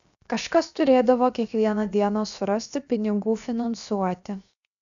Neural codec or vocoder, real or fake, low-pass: codec, 16 kHz, 0.7 kbps, FocalCodec; fake; 7.2 kHz